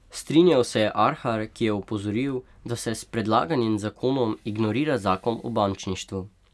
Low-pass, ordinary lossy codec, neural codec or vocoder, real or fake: none; none; none; real